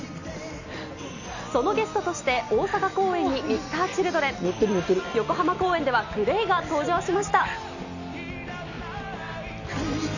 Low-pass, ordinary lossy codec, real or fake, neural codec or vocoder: 7.2 kHz; none; real; none